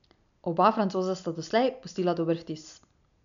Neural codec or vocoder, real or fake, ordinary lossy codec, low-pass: none; real; none; 7.2 kHz